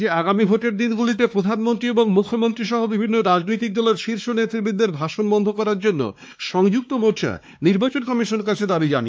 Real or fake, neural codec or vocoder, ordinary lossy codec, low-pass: fake; codec, 16 kHz, 2 kbps, X-Codec, WavLM features, trained on Multilingual LibriSpeech; none; none